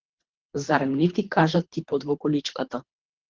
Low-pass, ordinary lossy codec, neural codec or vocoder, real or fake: 7.2 kHz; Opus, 16 kbps; codec, 24 kHz, 3 kbps, HILCodec; fake